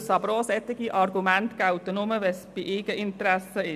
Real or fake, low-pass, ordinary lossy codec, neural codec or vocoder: real; 14.4 kHz; none; none